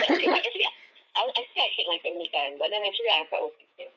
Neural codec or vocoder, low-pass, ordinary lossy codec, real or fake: codec, 16 kHz, 4 kbps, FreqCodec, larger model; none; none; fake